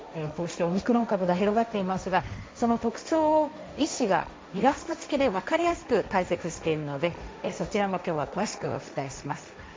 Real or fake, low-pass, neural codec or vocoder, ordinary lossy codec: fake; none; codec, 16 kHz, 1.1 kbps, Voila-Tokenizer; none